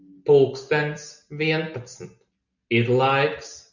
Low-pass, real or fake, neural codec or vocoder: 7.2 kHz; real; none